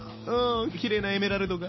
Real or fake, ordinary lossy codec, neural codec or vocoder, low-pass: real; MP3, 24 kbps; none; 7.2 kHz